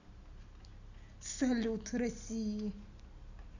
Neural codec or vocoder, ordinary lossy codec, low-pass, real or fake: none; none; 7.2 kHz; real